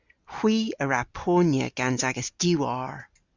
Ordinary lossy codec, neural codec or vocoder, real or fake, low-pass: Opus, 64 kbps; none; real; 7.2 kHz